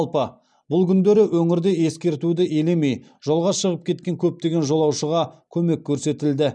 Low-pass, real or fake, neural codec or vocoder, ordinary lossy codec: 9.9 kHz; real; none; none